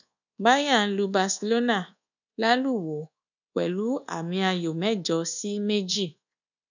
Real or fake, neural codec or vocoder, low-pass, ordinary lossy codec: fake; codec, 24 kHz, 1.2 kbps, DualCodec; 7.2 kHz; none